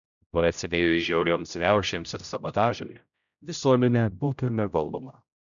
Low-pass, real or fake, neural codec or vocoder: 7.2 kHz; fake; codec, 16 kHz, 0.5 kbps, X-Codec, HuBERT features, trained on general audio